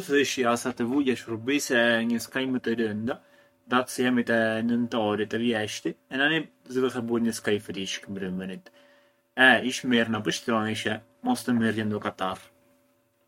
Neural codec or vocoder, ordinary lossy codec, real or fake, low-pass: codec, 44.1 kHz, 7.8 kbps, Pupu-Codec; MP3, 64 kbps; fake; 19.8 kHz